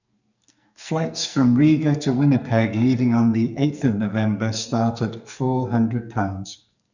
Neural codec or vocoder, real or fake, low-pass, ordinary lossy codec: codec, 44.1 kHz, 2.6 kbps, SNAC; fake; 7.2 kHz; none